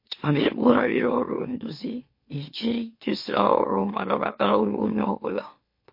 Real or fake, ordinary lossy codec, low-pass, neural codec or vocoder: fake; MP3, 32 kbps; 5.4 kHz; autoencoder, 44.1 kHz, a latent of 192 numbers a frame, MeloTTS